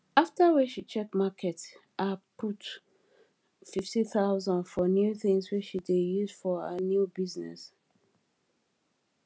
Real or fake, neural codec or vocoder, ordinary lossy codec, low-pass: real; none; none; none